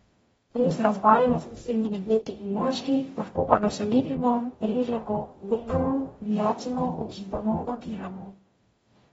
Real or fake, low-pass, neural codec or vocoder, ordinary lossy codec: fake; 19.8 kHz; codec, 44.1 kHz, 0.9 kbps, DAC; AAC, 24 kbps